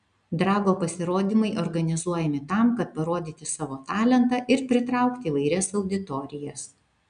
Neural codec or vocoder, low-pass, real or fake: none; 9.9 kHz; real